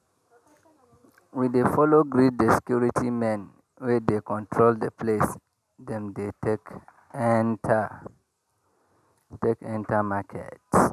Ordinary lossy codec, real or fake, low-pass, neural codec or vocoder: none; real; 14.4 kHz; none